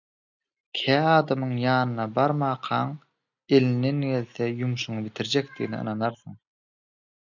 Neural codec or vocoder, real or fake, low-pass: none; real; 7.2 kHz